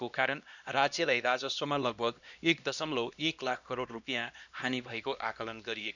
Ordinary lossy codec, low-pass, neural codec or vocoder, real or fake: none; 7.2 kHz; codec, 16 kHz, 1 kbps, X-Codec, HuBERT features, trained on LibriSpeech; fake